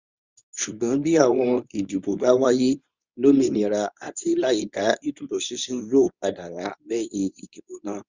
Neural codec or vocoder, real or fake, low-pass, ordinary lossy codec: codec, 24 kHz, 0.9 kbps, WavTokenizer, medium speech release version 2; fake; 7.2 kHz; Opus, 64 kbps